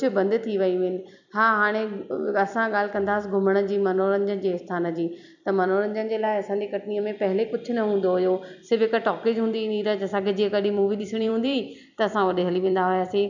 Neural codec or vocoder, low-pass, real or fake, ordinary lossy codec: vocoder, 44.1 kHz, 128 mel bands every 256 samples, BigVGAN v2; 7.2 kHz; fake; none